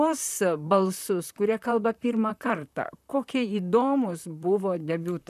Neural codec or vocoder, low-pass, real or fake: vocoder, 44.1 kHz, 128 mel bands, Pupu-Vocoder; 14.4 kHz; fake